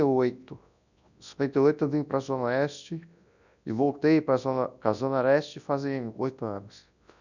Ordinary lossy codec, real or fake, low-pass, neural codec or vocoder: none; fake; 7.2 kHz; codec, 24 kHz, 0.9 kbps, WavTokenizer, large speech release